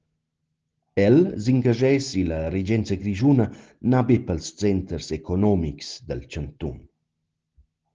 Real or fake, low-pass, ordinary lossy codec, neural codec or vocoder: real; 7.2 kHz; Opus, 16 kbps; none